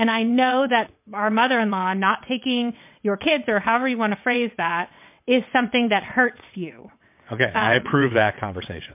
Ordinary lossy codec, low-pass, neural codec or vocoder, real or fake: MP3, 32 kbps; 3.6 kHz; vocoder, 22.05 kHz, 80 mel bands, WaveNeXt; fake